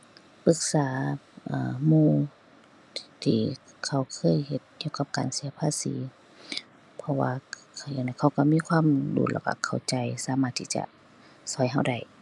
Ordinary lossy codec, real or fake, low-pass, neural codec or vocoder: none; real; none; none